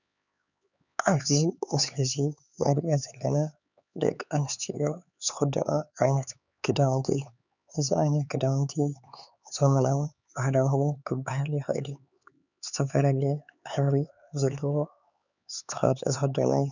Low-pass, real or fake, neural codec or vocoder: 7.2 kHz; fake; codec, 16 kHz, 4 kbps, X-Codec, HuBERT features, trained on LibriSpeech